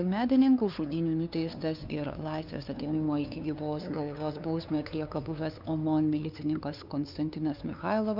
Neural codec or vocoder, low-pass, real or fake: codec, 16 kHz, 2 kbps, FunCodec, trained on LibriTTS, 25 frames a second; 5.4 kHz; fake